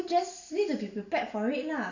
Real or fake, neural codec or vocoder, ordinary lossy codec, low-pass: real; none; none; 7.2 kHz